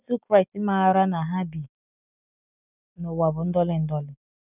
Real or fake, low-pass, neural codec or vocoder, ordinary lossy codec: real; 3.6 kHz; none; none